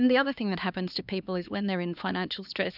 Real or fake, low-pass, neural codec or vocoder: fake; 5.4 kHz; codec, 16 kHz, 2 kbps, X-Codec, HuBERT features, trained on LibriSpeech